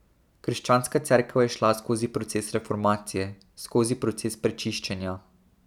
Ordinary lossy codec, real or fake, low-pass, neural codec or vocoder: none; real; 19.8 kHz; none